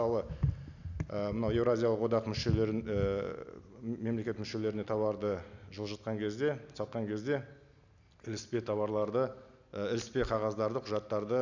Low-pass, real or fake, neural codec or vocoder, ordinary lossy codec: 7.2 kHz; real; none; none